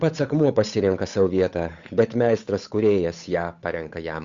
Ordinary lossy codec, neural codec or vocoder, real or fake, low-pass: Opus, 64 kbps; codec, 16 kHz, 8 kbps, FunCodec, trained on Chinese and English, 25 frames a second; fake; 7.2 kHz